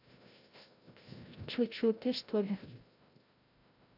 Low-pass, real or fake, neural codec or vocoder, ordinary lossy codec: 5.4 kHz; fake; codec, 16 kHz, 0.5 kbps, FreqCodec, larger model; AAC, 32 kbps